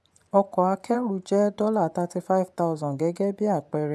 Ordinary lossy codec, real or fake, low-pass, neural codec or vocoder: none; fake; none; vocoder, 24 kHz, 100 mel bands, Vocos